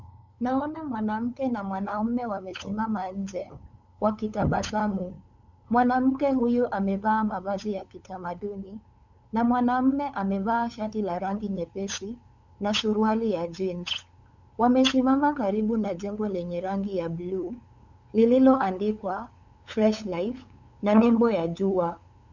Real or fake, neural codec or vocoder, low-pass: fake; codec, 16 kHz, 8 kbps, FunCodec, trained on LibriTTS, 25 frames a second; 7.2 kHz